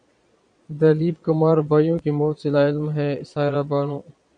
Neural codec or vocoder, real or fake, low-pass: vocoder, 22.05 kHz, 80 mel bands, Vocos; fake; 9.9 kHz